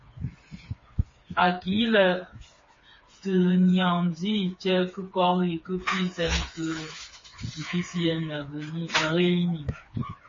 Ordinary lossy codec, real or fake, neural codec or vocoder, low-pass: MP3, 32 kbps; fake; codec, 16 kHz, 4 kbps, FreqCodec, smaller model; 7.2 kHz